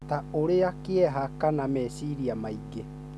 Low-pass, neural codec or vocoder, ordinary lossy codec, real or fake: none; none; none; real